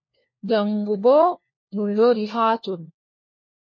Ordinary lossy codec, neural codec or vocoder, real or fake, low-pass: MP3, 32 kbps; codec, 16 kHz, 1 kbps, FunCodec, trained on LibriTTS, 50 frames a second; fake; 7.2 kHz